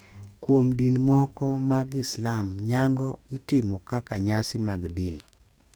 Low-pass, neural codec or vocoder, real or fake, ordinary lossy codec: none; codec, 44.1 kHz, 2.6 kbps, DAC; fake; none